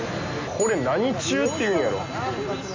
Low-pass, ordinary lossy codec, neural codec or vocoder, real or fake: 7.2 kHz; AAC, 48 kbps; none; real